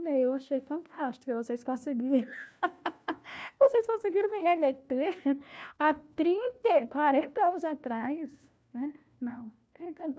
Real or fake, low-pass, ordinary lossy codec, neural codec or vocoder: fake; none; none; codec, 16 kHz, 1 kbps, FunCodec, trained on LibriTTS, 50 frames a second